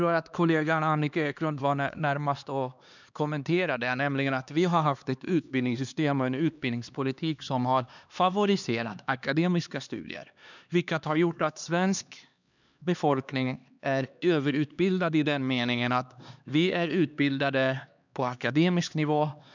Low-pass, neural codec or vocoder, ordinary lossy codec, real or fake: 7.2 kHz; codec, 16 kHz, 2 kbps, X-Codec, HuBERT features, trained on LibriSpeech; none; fake